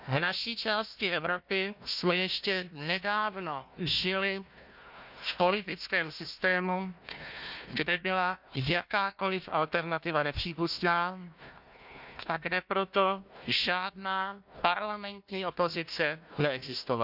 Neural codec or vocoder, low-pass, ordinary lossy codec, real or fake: codec, 16 kHz, 1 kbps, FunCodec, trained on Chinese and English, 50 frames a second; 5.4 kHz; none; fake